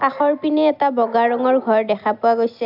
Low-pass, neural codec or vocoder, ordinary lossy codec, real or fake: 5.4 kHz; none; AAC, 48 kbps; real